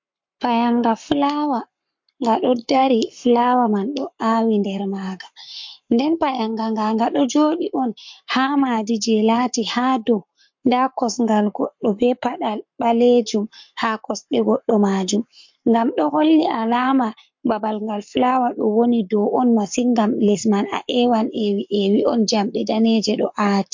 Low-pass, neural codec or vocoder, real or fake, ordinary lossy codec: 7.2 kHz; codec, 44.1 kHz, 7.8 kbps, Pupu-Codec; fake; MP3, 48 kbps